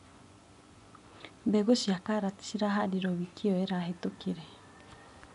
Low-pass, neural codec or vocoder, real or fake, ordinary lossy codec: 10.8 kHz; vocoder, 24 kHz, 100 mel bands, Vocos; fake; none